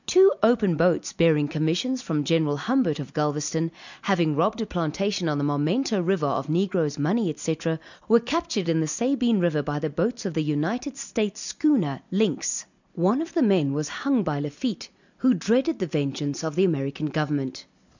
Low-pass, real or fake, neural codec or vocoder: 7.2 kHz; real; none